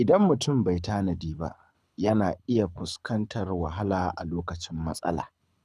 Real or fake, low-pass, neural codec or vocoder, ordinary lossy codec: fake; none; codec, 24 kHz, 6 kbps, HILCodec; none